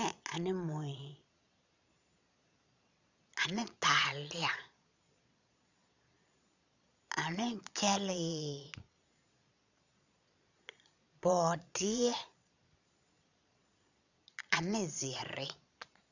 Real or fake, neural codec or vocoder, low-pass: fake; vocoder, 22.05 kHz, 80 mel bands, Vocos; 7.2 kHz